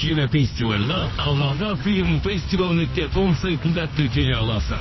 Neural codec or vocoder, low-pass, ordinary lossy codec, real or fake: codec, 24 kHz, 0.9 kbps, WavTokenizer, medium music audio release; 7.2 kHz; MP3, 24 kbps; fake